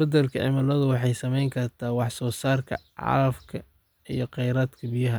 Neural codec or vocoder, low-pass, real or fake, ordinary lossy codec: none; none; real; none